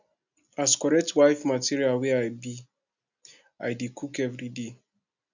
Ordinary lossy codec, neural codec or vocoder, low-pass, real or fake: none; none; 7.2 kHz; real